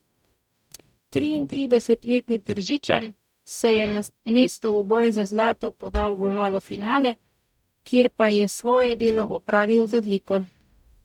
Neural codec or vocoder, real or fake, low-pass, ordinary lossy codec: codec, 44.1 kHz, 0.9 kbps, DAC; fake; 19.8 kHz; none